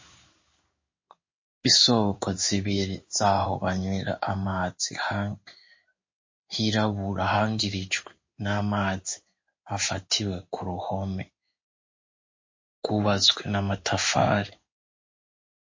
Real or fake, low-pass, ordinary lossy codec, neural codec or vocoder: fake; 7.2 kHz; MP3, 32 kbps; codec, 16 kHz in and 24 kHz out, 1 kbps, XY-Tokenizer